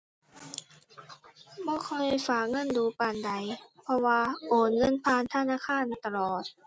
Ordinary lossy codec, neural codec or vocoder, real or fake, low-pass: none; none; real; none